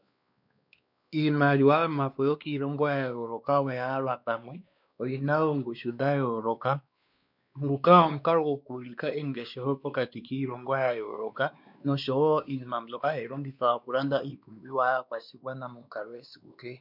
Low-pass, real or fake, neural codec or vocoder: 5.4 kHz; fake; codec, 16 kHz, 2 kbps, X-Codec, WavLM features, trained on Multilingual LibriSpeech